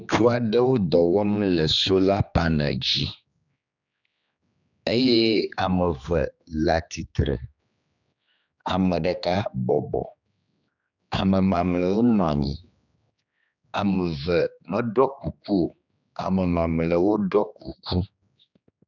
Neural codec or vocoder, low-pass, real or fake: codec, 16 kHz, 2 kbps, X-Codec, HuBERT features, trained on general audio; 7.2 kHz; fake